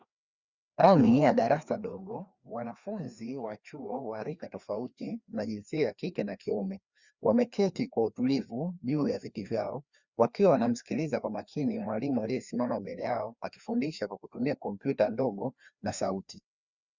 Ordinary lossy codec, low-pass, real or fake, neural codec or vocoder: Opus, 64 kbps; 7.2 kHz; fake; codec, 16 kHz, 2 kbps, FreqCodec, larger model